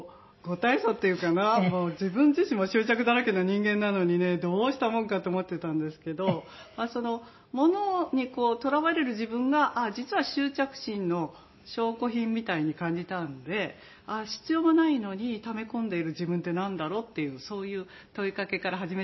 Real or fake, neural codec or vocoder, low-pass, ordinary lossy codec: real; none; 7.2 kHz; MP3, 24 kbps